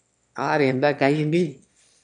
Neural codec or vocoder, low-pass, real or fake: autoencoder, 22.05 kHz, a latent of 192 numbers a frame, VITS, trained on one speaker; 9.9 kHz; fake